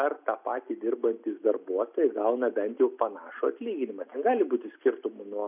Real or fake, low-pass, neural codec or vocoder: real; 3.6 kHz; none